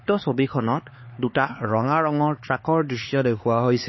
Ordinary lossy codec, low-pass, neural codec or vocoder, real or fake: MP3, 24 kbps; 7.2 kHz; codec, 16 kHz, 4 kbps, X-Codec, HuBERT features, trained on LibriSpeech; fake